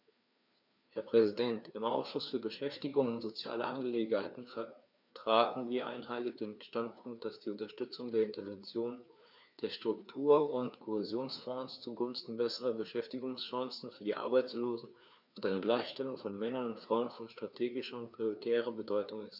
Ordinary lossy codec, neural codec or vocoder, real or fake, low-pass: none; codec, 16 kHz, 2 kbps, FreqCodec, larger model; fake; 5.4 kHz